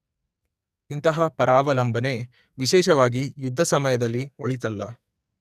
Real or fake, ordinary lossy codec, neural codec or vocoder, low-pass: fake; none; codec, 44.1 kHz, 2.6 kbps, SNAC; 14.4 kHz